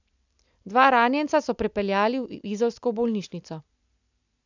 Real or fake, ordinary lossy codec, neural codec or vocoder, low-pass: real; none; none; 7.2 kHz